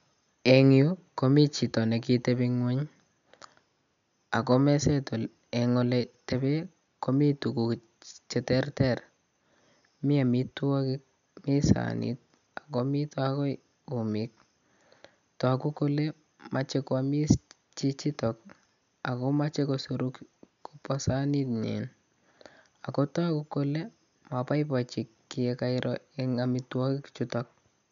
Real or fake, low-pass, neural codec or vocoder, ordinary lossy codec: real; 7.2 kHz; none; none